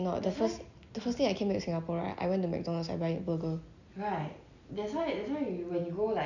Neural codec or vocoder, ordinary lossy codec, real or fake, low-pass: none; none; real; 7.2 kHz